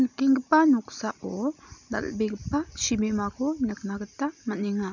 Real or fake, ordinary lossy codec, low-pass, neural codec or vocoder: real; none; 7.2 kHz; none